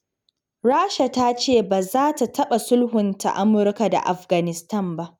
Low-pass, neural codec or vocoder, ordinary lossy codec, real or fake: 19.8 kHz; none; none; real